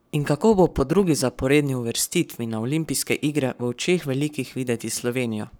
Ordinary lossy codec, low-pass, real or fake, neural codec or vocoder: none; none; fake; codec, 44.1 kHz, 7.8 kbps, Pupu-Codec